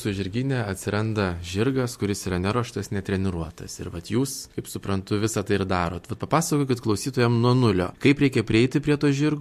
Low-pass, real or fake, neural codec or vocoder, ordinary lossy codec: 14.4 kHz; real; none; MP3, 64 kbps